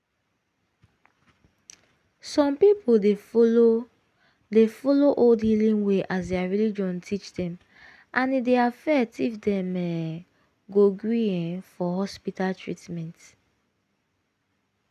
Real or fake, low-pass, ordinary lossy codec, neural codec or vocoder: real; 14.4 kHz; none; none